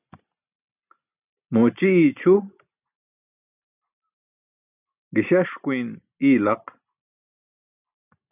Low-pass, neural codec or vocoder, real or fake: 3.6 kHz; none; real